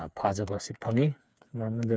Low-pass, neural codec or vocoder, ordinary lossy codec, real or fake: none; codec, 16 kHz, 4 kbps, FreqCodec, smaller model; none; fake